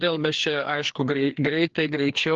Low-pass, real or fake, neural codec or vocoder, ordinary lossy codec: 7.2 kHz; fake; codec, 16 kHz, 2 kbps, FreqCodec, larger model; Opus, 16 kbps